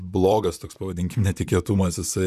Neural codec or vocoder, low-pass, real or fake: vocoder, 44.1 kHz, 128 mel bands, Pupu-Vocoder; 14.4 kHz; fake